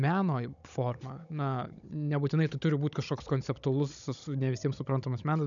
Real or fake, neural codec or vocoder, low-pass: fake; codec, 16 kHz, 16 kbps, FunCodec, trained on Chinese and English, 50 frames a second; 7.2 kHz